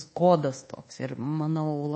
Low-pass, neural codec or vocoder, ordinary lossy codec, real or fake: 9.9 kHz; codec, 24 kHz, 1.2 kbps, DualCodec; MP3, 32 kbps; fake